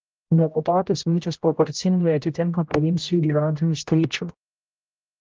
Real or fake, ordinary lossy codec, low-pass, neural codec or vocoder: fake; Opus, 24 kbps; 7.2 kHz; codec, 16 kHz, 0.5 kbps, X-Codec, HuBERT features, trained on general audio